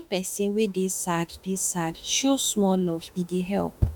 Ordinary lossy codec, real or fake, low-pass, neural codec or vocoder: none; fake; 19.8 kHz; autoencoder, 48 kHz, 32 numbers a frame, DAC-VAE, trained on Japanese speech